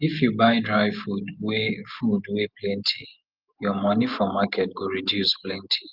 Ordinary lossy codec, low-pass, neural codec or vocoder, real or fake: Opus, 32 kbps; 5.4 kHz; none; real